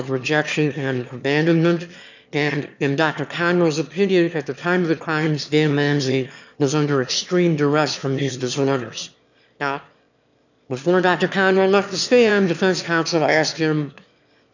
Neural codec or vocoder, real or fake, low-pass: autoencoder, 22.05 kHz, a latent of 192 numbers a frame, VITS, trained on one speaker; fake; 7.2 kHz